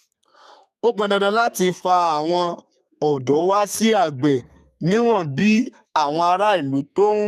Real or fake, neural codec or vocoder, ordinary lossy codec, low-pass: fake; codec, 32 kHz, 1.9 kbps, SNAC; none; 14.4 kHz